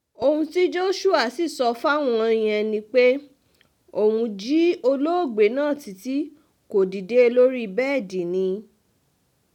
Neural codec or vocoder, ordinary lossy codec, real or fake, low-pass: none; none; real; 19.8 kHz